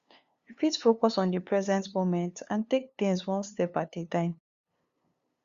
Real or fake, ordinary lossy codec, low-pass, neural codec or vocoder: fake; Opus, 64 kbps; 7.2 kHz; codec, 16 kHz, 2 kbps, FunCodec, trained on LibriTTS, 25 frames a second